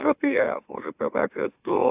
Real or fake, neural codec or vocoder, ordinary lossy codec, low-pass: fake; autoencoder, 44.1 kHz, a latent of 192 numbers a frame, MeloTTS; AAC, 32 kbps; 3.6 kHz